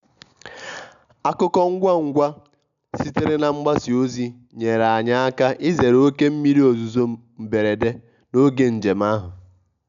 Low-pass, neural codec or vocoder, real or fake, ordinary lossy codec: 7.2 kHz; none; real; none